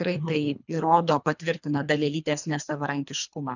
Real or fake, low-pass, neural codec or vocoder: fake; 7.2 kHz; codec, 24 kHz, 3 kbps, HILCodec